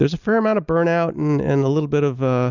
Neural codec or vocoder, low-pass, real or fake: none; 7.2 kHz; real